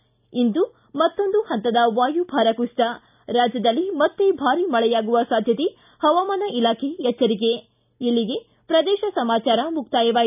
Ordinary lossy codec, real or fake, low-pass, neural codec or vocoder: none; real; 3.6 kHz; none